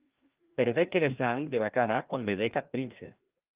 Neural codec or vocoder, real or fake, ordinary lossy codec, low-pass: codec, 16 kHz, 1 kbps, FreqCodec, larger model; fake; Opus, 24 kbps; 3.6 kHz